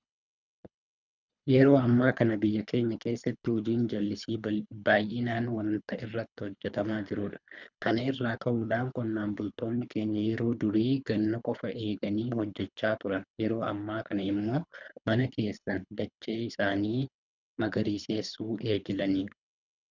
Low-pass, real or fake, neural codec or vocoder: 7.2 kHz; fake; codec, 24 kHz, 3 kbps, HILCodec